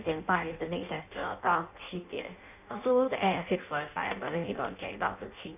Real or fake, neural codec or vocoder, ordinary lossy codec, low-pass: fake; codec, 16 kHz in and 24 kHz out, 0.6 kbps, FireRedTTS-2 codec; none; 3.6 kHz